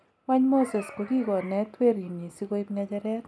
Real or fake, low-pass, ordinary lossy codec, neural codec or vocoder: real; none; none; none